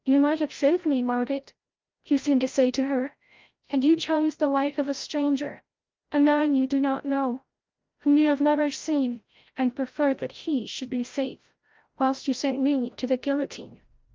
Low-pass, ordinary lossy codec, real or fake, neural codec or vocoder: 7.2 kHz; Opus, 32 kbps; fake; codec, 16 kHz, 0.5 kbps, FreqCodec, larger model